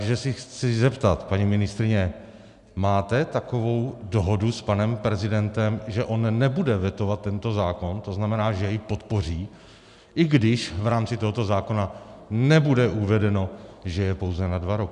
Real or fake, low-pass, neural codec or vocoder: real; 10.8 kHz; none